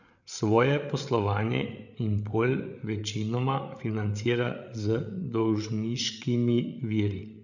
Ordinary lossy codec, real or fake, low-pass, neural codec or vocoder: none; fake; 7.2 kHz; codec, 16 kHz, 8 kbps, FreqCodec, larger model